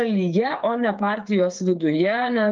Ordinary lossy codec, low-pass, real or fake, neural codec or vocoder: Opus, 24 kbps; 7.2 kHz; fake; codec, 16 kHz, 8 kbps, FreqCodec, smaller model